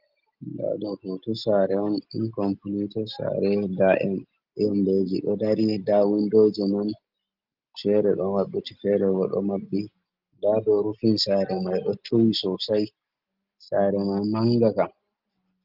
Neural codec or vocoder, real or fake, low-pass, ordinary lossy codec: none; real; 5.4 kHz; Opus, 24 kbps